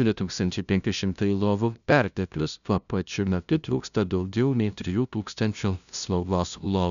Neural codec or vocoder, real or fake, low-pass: codec, 16 kHz, 0.5 kbps, FunCodec, trained on LibriTTS, 25 frames a second; fake; 7.2 kHz